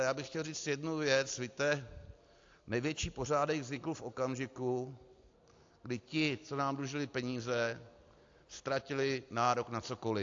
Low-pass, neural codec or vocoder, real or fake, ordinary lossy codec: 7.2 kHz; none; real; AAC, 48 kbps